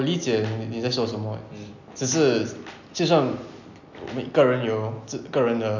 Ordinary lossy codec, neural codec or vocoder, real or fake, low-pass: none; none; real; 7.2 kHz